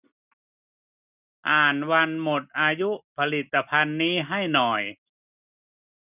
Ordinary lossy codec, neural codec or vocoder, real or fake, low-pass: none; none; real; 3.6 kHz